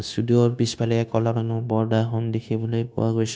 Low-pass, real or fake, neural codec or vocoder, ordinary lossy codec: none; fake; codec, 16 kHz, 0.9 kbps, LongCat-Audio-Codec; none